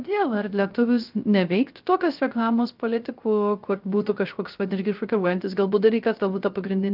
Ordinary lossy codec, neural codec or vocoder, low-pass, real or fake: Opus, 24 kbps; codec, 16 kHz, 0.3 kbps, FocalCodec; 5.4 kHz; fake